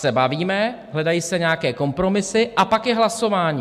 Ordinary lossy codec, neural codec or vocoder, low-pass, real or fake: MP3, 96 kbps; none; 14.4 kHz; real